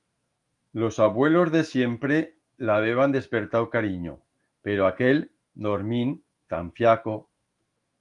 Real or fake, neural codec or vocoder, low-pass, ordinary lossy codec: fake; autoencoder, 48 kHz, 128 numbers a frame, DAC-VAE, trained on Japanese speech; 10.8 kHz; Opus, 32 kbps